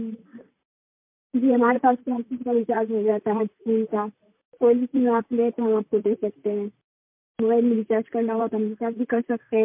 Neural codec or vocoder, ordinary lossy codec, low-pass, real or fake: vocoder, 44.1 kHz, 128 mel bands, Pupu-Vocoder; MP3, 32 kbps; 3.6 kHz; fake